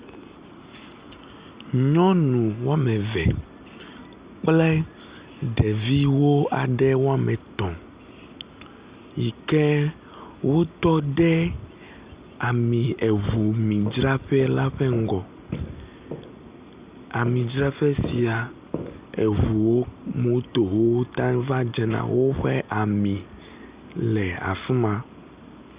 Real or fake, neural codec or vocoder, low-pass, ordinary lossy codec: real; none; 3.6 kHz; Opus, 64 kbps